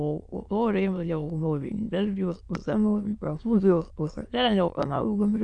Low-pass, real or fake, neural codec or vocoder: 9.9 kHz; fake; autoencoder, 22.05 kHz, a latent of 192 numbers a frame, VITS, trained on many speakers